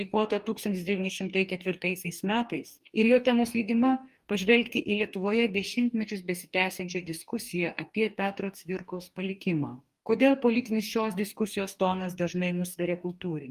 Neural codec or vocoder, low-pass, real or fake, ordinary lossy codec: codec, 44.1 kHz, 2.6 kbps, DAC; 14.4 kHz; fake; Opus, 32 kbps